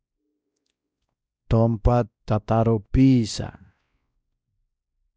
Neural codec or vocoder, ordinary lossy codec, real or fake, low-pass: codec, 16 kHz, 1 kbps, X-Codec, WavLM features, trained on Multilingual LibriSpeech; none; fake; none